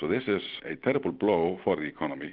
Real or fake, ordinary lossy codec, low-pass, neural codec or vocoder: real; Opus, 24 kbps; 5.4 kHz; none